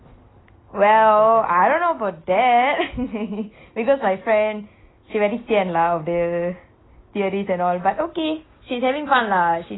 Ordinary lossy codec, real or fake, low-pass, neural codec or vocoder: AAC, 16 kbps; real; 7.2 kHz; none